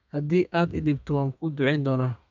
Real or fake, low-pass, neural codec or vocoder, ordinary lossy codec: fake; 7.2 kHz; codec, 32 kHz, 1.9 kbps, SNAC; none